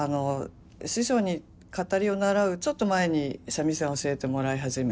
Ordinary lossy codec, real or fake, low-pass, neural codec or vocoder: none; real; none; none